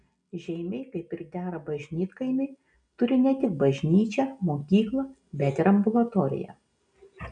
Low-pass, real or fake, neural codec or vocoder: 9.9 kHz; real; none